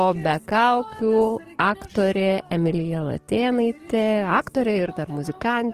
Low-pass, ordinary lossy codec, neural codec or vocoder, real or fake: 14.4 kHz; Opus, 16 kbps; codec, 44.1 kHz, 7.8 kbps, DAC; fake